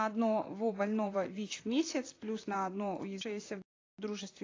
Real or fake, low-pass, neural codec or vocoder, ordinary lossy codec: fake; 7.2 kHz; vocoder, 44.1 kHz, 128 mel bands, Pupu-Vocoder; AAC, 32 kbps